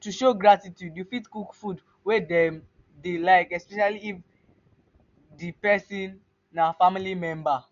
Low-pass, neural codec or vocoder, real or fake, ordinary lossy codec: 7.2 kHz; none; real; none